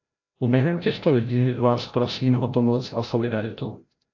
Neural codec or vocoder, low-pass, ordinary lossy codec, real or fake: codec, 16 kHz, 0.5 kbps, FreqCodec, larger model; 7.2 kHz; AAC, 48 kbps; fake